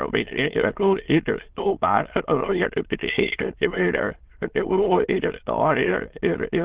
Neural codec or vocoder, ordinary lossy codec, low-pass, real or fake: autoencoder, 22.05 kHz, a latent of 192 numbers a frame, VITS, trained on many speakers; Opus, 16 kbps; 3.6 kHz; fake